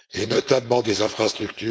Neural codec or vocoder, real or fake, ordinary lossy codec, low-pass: codec, 16 kHz, 4.8 kbps, FACodec; fake; none; none